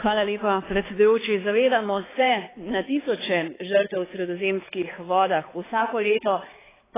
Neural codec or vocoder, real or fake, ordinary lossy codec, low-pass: codec, 16 kHz, 4 kbps, X-Codec, HuBERT features, trained on balanced general audio; fake; AAC, 16 kbps; 3.6 kHz